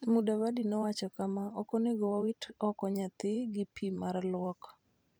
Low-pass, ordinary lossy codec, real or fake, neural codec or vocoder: none; none; fake; vocoder, 44.1 kHz, 128 mel bands every 512 samples, BigVGAN v2